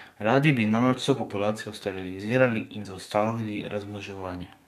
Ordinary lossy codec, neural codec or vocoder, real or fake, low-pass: none; codec, 32 kHz, 1.9 kbps, SNAC; fake; 14.4 kHz